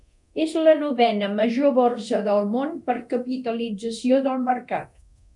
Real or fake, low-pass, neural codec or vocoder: fake; 10.8 kHz; codec, 24 kHz, 0.9 kbps, DualCodec